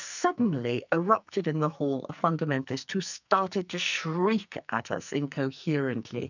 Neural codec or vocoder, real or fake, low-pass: codec, 44.1 kHz, 2.6 kbps, SNAC; fake; 7.2 kHz